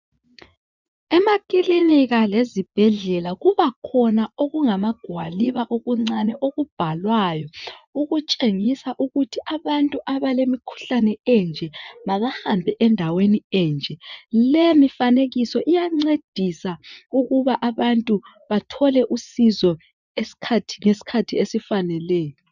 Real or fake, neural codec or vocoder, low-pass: fake; vocoder, 24 kHz, 100 mel bands, Vocos; 7.2 kHz